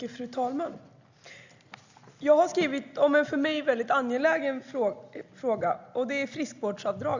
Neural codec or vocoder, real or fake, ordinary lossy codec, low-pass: none; real; Opus, 64 kbps; 7.2 kHz